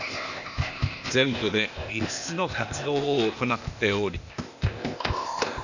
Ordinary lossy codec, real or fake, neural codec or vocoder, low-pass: none; fake; codec, 16 kHz, 0.8 kbps, ZipCodec; 7.2 kHz